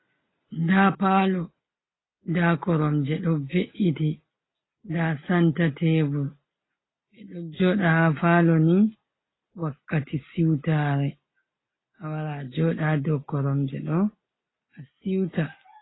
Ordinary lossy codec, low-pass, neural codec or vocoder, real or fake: AAC, 16 kbps; 7.2 kHz; none; real